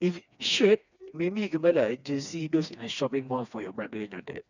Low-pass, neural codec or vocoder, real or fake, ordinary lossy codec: 7.2 kHz; codec, 16 kHz, 2 kbps, FreqCodec, smaller model; fake; none